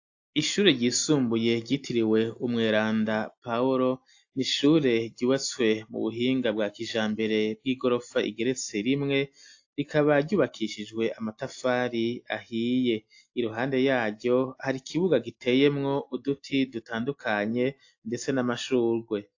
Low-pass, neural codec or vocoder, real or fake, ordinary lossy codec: 7.2 kHz; none; real; AAC, 48 kbps